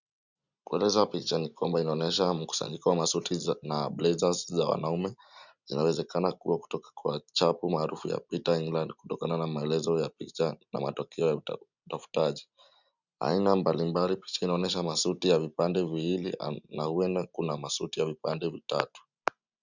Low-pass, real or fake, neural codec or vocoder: 7.2 kHz; real; none